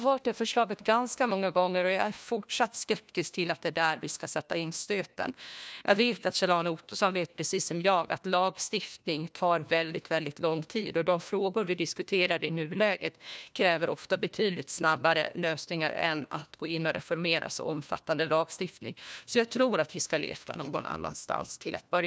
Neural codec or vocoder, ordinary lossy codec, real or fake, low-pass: codec, 16 kHz, 1 kbps, FunCodec, trained on LibriTTS, 50 frames a second; none; fake; none